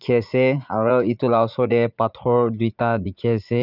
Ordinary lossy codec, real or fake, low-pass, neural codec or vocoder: none; fake; 5.4 kHz; vocoder, 44.1 kHz, 80 mel bands, Vocos